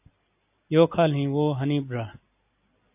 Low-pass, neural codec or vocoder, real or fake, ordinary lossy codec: 3.6 kHz; none; real; AAC, 32 kbps